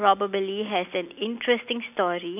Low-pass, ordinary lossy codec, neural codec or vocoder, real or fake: 3.6 kHz; none; none; real